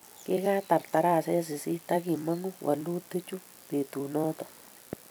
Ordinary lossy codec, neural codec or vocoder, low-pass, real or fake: none; vocoder, 44.1 kHz, 128 mel bands every 256 samples, BigVGAN v2; none; fake